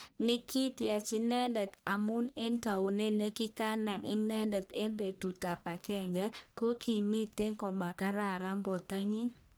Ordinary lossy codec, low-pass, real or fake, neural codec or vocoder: none; none; fake; codec, 44.1 kHz, 1.7 kbps, Pupu-Codec